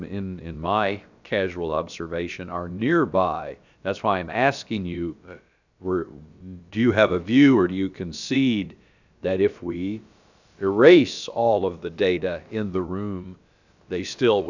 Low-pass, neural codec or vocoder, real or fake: 7.2 kHz; codec, 16 kHz, about 1 kbps, DyCAST, with the encoder's durations; fake